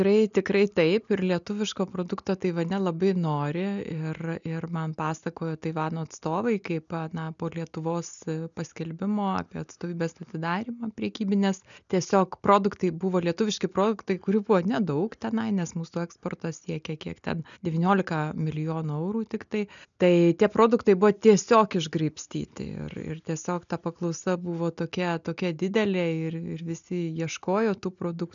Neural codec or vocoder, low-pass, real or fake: none; 7.2 kHz; real